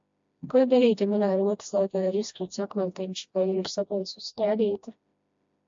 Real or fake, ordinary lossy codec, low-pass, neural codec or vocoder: fake; MP3, 48 kbps; 7.2 kHz; codec, 16 kHz, 1 kbps, FreqCodec, smaller model